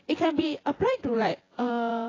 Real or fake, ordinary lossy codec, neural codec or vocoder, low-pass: fake; AAC, 32 kbps; vocoder, 24 kHz, 100 mel bands, Vocos; 7.2 kHz